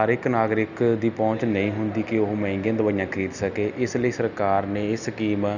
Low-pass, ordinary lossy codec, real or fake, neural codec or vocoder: 7.2 kHz; none; real; none